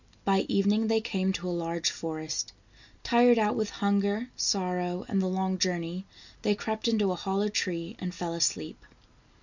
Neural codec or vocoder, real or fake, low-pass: none; real; 7.2 kHz